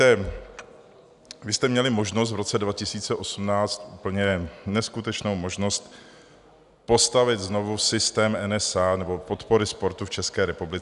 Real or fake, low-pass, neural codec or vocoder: real; 10.8 kHz; none